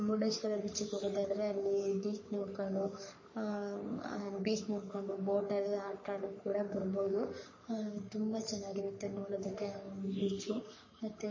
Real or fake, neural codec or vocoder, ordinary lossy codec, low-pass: fake; codec, 44.1 kHz, 3.4 kbps, Pupu-Codec; MP3, 32 kbps; 7.2 kHz